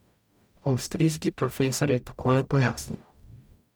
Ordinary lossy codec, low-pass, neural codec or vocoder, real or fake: none; none; codec, 44.1 kHz, 0.9 kbps, DAC; fake